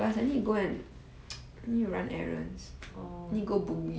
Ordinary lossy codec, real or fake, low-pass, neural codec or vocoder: none; real; none; none